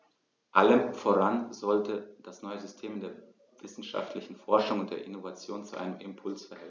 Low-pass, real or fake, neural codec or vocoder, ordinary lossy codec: none; real; none; none